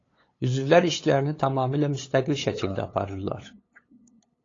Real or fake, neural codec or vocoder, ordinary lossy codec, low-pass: fake; codec, 16 kHz, 8 kbps, FunCodec, trained on LibriTTS, 25 frames a second; AAC, 32 kbps; 7.2 kHz